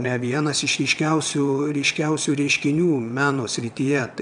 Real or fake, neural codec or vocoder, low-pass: fake; vocoder, 22.05 kHz, 80 mel bands, WaveNeXt; 9.9 kHz